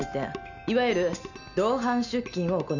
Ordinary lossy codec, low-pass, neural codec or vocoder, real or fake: none; 7.2 kHz; none; real